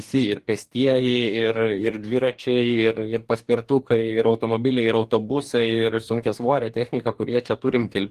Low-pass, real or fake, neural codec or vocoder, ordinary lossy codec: 14.4 kHz; fake; codec, 44.1 kHz, 2.6 kbps, DAC; Opus, 24 kbps